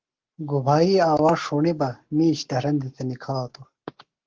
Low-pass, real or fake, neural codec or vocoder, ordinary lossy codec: 7.2 kHz; fake; codec, 44.1 kHz, 7.8 kbps, Pupu-Codec; Opus, 16 kbps